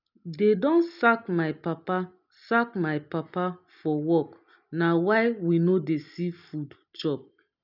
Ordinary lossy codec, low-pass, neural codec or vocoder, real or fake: none; 5.4 kHz; none; real